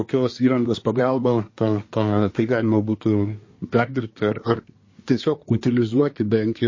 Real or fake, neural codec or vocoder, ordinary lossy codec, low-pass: fake; codec, 24 kHz, 1 kbps, SNAC; MP3, 32 kbps; 7.2 kHz